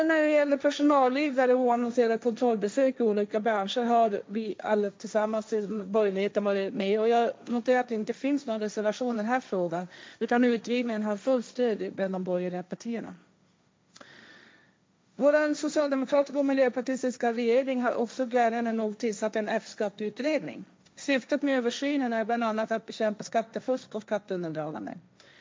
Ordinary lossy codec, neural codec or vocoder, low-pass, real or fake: none; codec, 16 kHz, 1.1 kbps, Voila-Tokenizer; none; fake